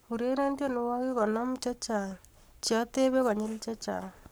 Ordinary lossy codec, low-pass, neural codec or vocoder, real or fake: none; none; codec, 44.1 kHz, 7.8 kbps, Pupu-Codec; fake